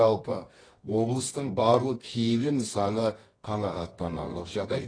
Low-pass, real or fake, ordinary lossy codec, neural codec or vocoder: 9.9 kHz; fake; AAC, 32 kbps; codec, 24 kHz, 0.9 kbps, WavTokenizer, medium music audio release